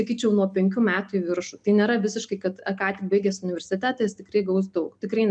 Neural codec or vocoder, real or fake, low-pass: none; real; 9.9 kHz